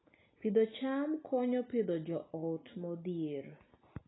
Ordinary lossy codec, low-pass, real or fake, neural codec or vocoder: AAC, 16 kbps; 7.2 kHz; real; none